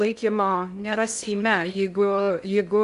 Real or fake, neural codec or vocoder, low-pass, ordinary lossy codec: fake; codec, 16 kHz in and 24 kHz out, 0.6 kbps, FocalCodec, streaming, 2048 codes; 10.8 kHz; AAC, 96 kbps